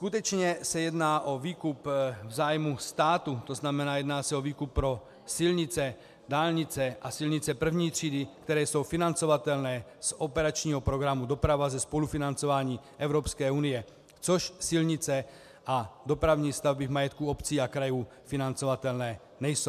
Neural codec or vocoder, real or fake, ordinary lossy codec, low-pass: none; real; MP3, 96 kbps; 14.4 kHz